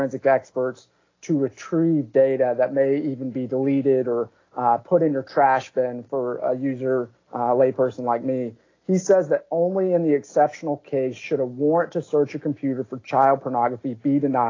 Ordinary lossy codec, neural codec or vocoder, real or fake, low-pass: AAC, 32 kbps; none; real; 7.2 kHz